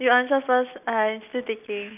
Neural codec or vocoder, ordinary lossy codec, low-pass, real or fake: none; none; 3.6 kHz; real